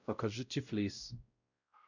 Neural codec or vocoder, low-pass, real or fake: codec, 16 kHz, 0.5 kbps, X-Codec, WavLM features, trained on Multilingual LibriSpeech; 7.2 kHz; fake